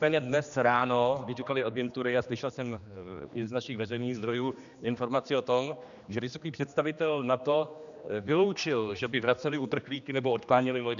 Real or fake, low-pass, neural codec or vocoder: fake; 7.2 kHz; codec, 16 kHz, 2 kbps, X-Codec, HuBERT features, trained on general audio